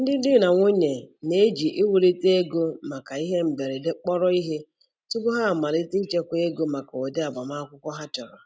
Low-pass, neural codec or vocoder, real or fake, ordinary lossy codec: none; none; real; none